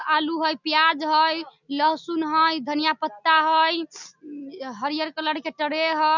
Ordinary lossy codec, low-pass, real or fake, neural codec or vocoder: none; 7.2 kHz; real; none